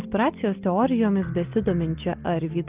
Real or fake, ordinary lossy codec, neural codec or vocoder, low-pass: real; Opus, 32 kbps; none; 3.6 kHz